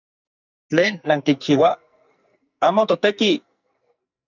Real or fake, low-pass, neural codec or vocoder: fake; 7.2 kHz; codec, 44.1 kHz, 2.6 kbps, SNAC